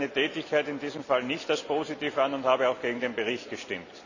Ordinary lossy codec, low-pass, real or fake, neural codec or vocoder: none; 7.2 kHz; real; none